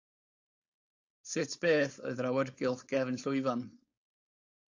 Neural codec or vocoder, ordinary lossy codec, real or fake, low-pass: codec, 16 kHz, 4.8 kbps, FACodec; AAC, 48 kbps; fake; 7.2 kHz